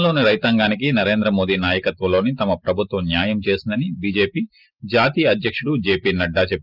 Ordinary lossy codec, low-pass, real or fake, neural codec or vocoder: Opus, 24 kbps; 5.4 kHz; real; none